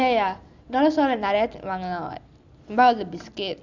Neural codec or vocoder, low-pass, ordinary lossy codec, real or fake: none; 7.2 kHz; Opus, 64 kbps; real